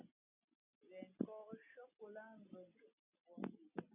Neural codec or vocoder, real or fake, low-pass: none; real; 3.6 kHz